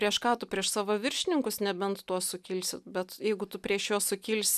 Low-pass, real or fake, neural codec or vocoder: 14.4 kHz; real; none